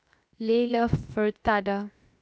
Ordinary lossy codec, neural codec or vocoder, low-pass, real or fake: none; codec, 16 kHz, 0.7 kbps, FocalCodec; none; fake